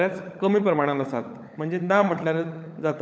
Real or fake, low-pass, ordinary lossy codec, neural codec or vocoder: fake; none; none; codec, 16 kHz, 16 kbps, FunCodec, trained on LibriTTS, 50 frames a second